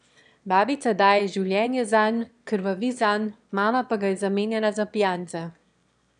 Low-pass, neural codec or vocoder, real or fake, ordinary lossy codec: 9.9 kHz; autoencoder, 22.05 kHz, a latent of 192 numbers a frame, VITS, trained on one speaker; fake; none